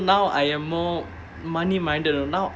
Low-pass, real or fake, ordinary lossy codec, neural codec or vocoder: none; real; none; none